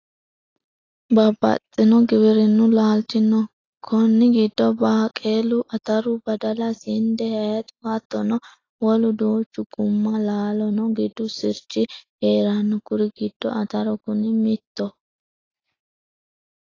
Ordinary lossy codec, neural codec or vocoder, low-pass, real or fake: AAC, 32 kbps; none; 7.2 kHz; real